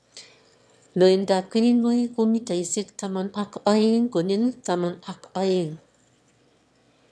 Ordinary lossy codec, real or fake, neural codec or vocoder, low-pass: none; fake; autoencoder, 22.05 kHz, a latent of 192 numbers a frame, VITS, trained on one speaker; none